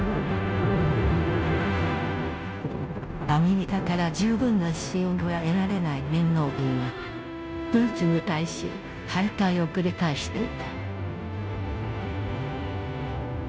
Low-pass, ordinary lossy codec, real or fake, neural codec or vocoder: none; none; fake; codec, 16 kHz, 0.5 kbps, FunCodec, trained on Chinese and English, 25 frames a second